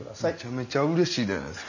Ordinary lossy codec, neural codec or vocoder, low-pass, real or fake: none; none; 7.2 kHz; real